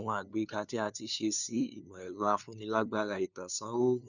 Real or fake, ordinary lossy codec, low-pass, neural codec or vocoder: fake; none; 7.2 kHz; codec, 16 kHz, 2 kbps, FunCodec, trained on LibriTTS, 25 frames a second